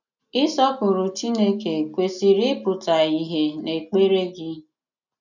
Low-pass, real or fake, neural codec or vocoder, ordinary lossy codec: 7.2 kHz; real; none; none